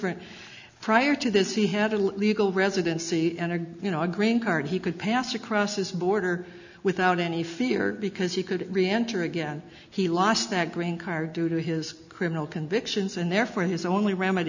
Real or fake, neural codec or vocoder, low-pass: real; none; 7.2 kHz